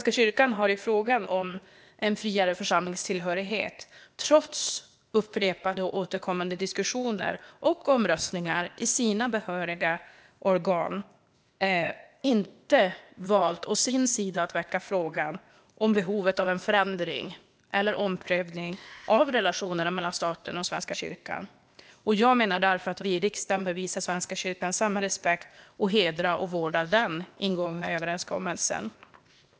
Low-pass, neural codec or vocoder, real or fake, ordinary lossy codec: none; codec, 16 kHz, 0.8 kbps, ZipCodec; fake; none